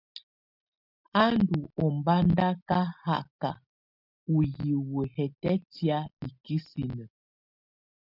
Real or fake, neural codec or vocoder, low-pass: real; none; 5.4 kHz